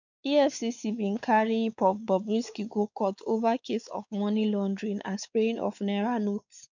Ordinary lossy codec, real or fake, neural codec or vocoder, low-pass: none; fake; codec, 16 kHz, 4 kbps, X-Codec, WavLM features, trained on Multilingual LibriSpeech; 7.2 kHz